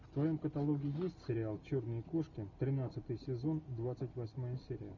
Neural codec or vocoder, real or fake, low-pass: none; real; 7.2 kHz